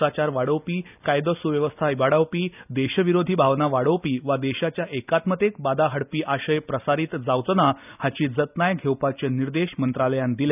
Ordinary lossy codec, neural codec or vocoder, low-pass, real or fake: none; none; 3.6 kHz; real